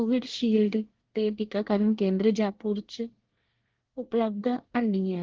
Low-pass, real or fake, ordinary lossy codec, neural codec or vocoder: 7.2 kHz; fake; Opus, 16 kbps; codec, 24 kHz, 1 kbps, SNAC